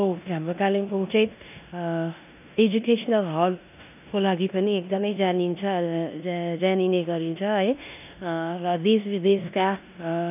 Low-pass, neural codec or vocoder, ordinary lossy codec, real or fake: 3.6 kHz; codec, 16 kHz in and 24 kHz out, 0.9 kbps, LongCat-Audio-Codec, four codebook decoder; none; fake